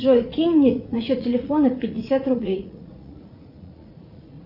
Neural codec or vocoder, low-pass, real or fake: vocoder, 44.1 kHz, 80 mel bands, Vocos; 5.4 kHz; fake